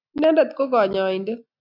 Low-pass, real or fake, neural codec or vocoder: 5.4 kHz; real; none